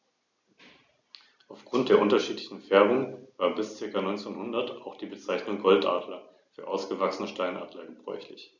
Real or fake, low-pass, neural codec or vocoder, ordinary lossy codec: real; 7.2 kHz; none; none